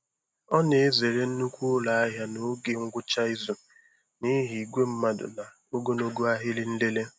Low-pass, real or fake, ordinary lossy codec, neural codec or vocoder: none; real; none; none